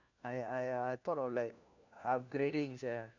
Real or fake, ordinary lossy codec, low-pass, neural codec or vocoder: fake; none; 7.2 kHz; codec, 16 kHz, 1 kbps, FunCodec, trained on LibriTTS, 50 frames a second